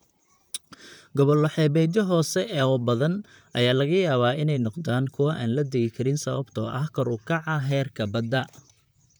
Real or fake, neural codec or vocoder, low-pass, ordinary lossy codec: fake; vocoder, 44.1 kHz, 128 mel bands, Pupu-Vocoder; none; none